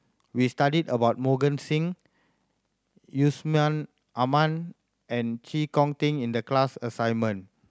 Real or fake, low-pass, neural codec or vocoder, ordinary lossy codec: real; none; none; none